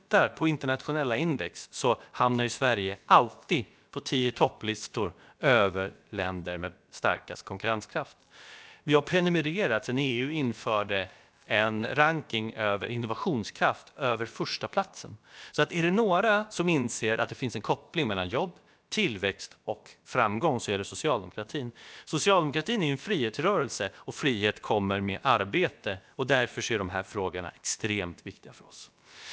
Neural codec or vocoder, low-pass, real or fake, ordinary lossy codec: codec, 16 kHz, about 1 kbps, DyCAST, with the encoder's durations; none; fake; none